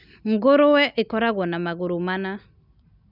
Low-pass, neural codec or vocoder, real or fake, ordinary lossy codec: 5.4 kHz; none; real; none